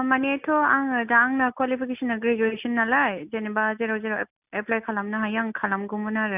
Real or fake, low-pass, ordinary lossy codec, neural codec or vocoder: real; 3.6 kHz; none; none